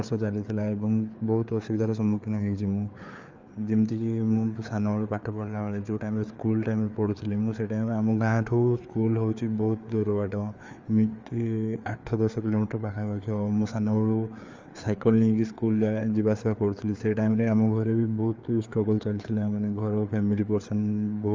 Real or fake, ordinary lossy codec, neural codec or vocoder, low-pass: fake; Opus, 32 kbps; codec, 16 kHz, 4 kbps, FreqCodec, larger model; 7.2 kHz